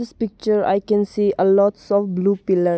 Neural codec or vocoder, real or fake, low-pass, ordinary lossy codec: none; real; none; none